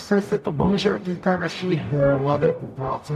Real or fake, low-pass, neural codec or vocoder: fake; 14.4 kHz; codec, 44.1 kHz, 0.9 kbps, DAC